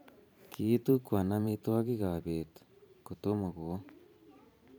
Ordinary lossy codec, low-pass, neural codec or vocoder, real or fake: none; none; vocoder, 44.1 kHz, 128 mel bands every 512 samples, BigVGAN v2; fake